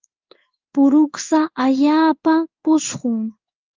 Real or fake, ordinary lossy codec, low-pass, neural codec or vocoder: fake; Opus, 32 kbps; 7.2 kHz; codec, 16 kHz in and 24 kHz out, 1 kbps, XY-Tokenizer